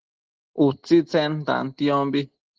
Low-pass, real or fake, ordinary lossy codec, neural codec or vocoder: 7.2 kHz; real; Opus, 16 kbps; none